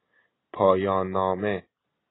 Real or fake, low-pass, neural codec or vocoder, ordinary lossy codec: real; 7.2 kHz; none; AAC, 16 kbps